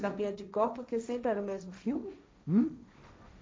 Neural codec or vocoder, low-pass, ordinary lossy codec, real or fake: codec, 16 kHz, 1.1 kbps, Voila-Tokenizer; none; none; fake